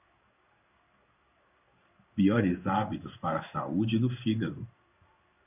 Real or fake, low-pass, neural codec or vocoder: fake; 3.6 kHz; codec, 16 kHz in and 24 kHz out, 1 kbps, XY-Tokenizer